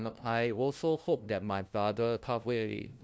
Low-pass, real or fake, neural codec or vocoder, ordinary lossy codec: none; fake; codec, 16 kHz, 0.5 kbps, FunCodec, trained on LibriTTS, 25 frames a second; none